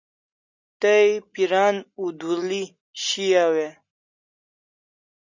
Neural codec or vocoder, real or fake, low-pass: none; real; 7.2 kHz